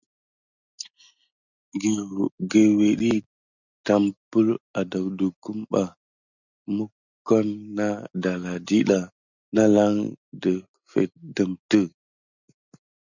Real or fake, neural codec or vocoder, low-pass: real; none; 7.2 kHz